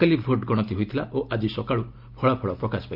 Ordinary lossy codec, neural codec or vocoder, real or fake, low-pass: Opus, 24 kbps; none; real; 5.4 kHz